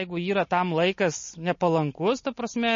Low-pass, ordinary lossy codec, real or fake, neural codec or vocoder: 7.2 kHz; MP3, 32 kbps; real; none